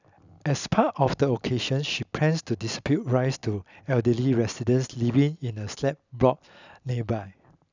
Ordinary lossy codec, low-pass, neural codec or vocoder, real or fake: none; 7.2 kHz; none; real